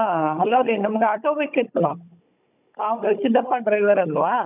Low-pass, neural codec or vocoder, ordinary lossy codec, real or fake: 3.6 kHz; codec, 16 kHz, 16 kbps, FunCodec, trained on Chinese and English, 50 frames a second; none; fake